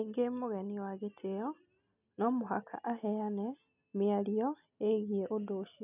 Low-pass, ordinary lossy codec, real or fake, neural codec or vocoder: 3.6 kHz; none; real; none